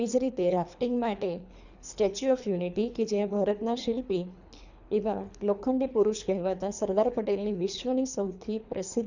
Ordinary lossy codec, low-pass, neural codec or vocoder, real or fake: none; 7.2 kHz; codec, 24 kHz, 3 kbps, HILCodec; fake